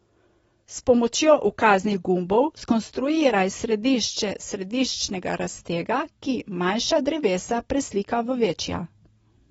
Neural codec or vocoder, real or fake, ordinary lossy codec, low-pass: none; real; AAC, 24 kbps; 19.8 kHz